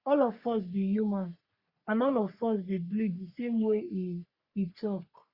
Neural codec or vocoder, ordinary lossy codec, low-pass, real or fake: codec, 44.1 kHz, 3.4 kbps, Pupu-Codec; Opus, 64 kbps; 5.4 kHz; fake